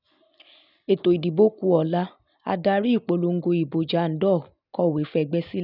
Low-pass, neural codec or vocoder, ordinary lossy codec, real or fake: 5.4 kHz; none; none; real